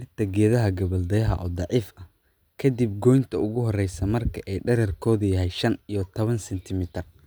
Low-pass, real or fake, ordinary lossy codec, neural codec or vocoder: none; real; none; none